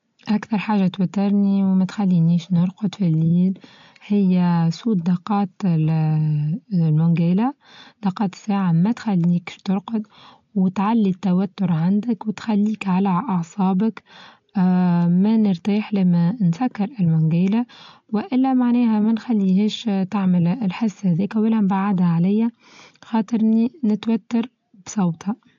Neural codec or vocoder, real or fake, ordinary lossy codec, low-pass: none; real; AAC, 48 kbps; 7.2 kHz